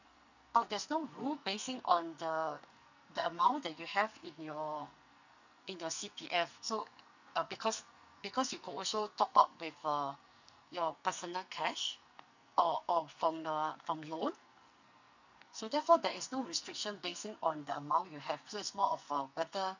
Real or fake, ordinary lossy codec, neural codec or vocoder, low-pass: fake; none; codec, 44.1 kHz, 2.6 kbps, SNAC; 7.2 kHz